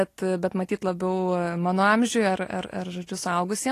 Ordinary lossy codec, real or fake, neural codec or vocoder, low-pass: AAC, 48 kbps; real; none; 14.4 kHz